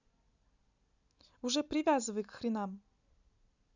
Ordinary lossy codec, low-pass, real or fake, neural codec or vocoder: none; 7.2 kHz; real; none